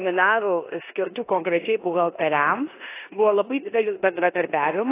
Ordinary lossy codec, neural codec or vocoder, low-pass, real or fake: AAC, 24 kbps; codec, 16 kHz in and 24 kHz out, 0.9 kbps, LongCat-Audio-Codec, four codebook decoder; 3.6 kHz; fake